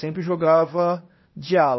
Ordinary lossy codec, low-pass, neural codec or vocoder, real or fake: MP3, 24 kbps; 7.2 kHz; codec, 16 kHz, 0.8 kbps, ZipCodec; fake